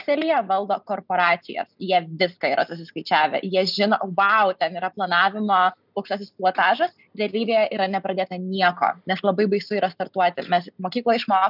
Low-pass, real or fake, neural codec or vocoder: 5.4 kHz; real; none